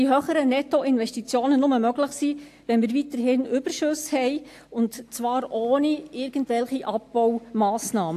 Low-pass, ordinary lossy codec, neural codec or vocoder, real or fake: 14.4 kHz; AAC, 64 kbps; none; real